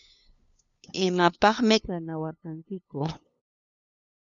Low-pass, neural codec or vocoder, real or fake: 7.2 kHz; codec, 16 kHz, 2 kbps, FunCodec, trained on LibriTTS, 25 frames a second; fake